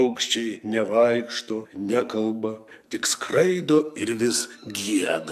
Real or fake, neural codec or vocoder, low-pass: fake; codec, 44.1 kHz, 2.6 kbps, SNAC; 14.4 kHz